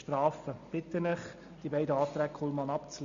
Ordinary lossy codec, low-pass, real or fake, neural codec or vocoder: Opus, 64 kbps; 7.2 kHz; real; none